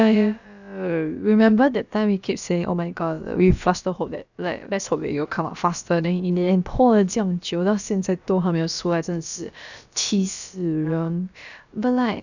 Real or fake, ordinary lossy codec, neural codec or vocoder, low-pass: fake; none; codec, 16 kHz, about 1 kbps, DyCAST, with the encoder's durations; 7.2 kHz